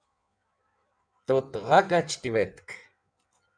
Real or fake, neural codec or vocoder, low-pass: fake; codec, 16 kHz in and 24 kHz out, 1.1 kbps, FireRedTTS-2 codec; 9.9 kHz